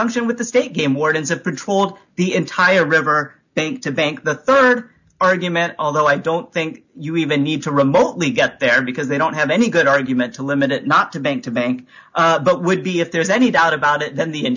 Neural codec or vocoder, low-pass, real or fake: none; 7.2 kHz; real